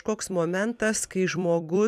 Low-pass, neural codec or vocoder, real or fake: 14.4 kHz; vocoder, 44.1 kHz, 128 mel bands every 256 samples, BigVGAN v2; fake